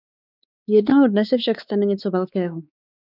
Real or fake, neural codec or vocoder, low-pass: fake; codec, 16 kHz, 4 kbps, X-Codec, WavLM features, trained on Multilingual LibriSpeech; 5.4 kHz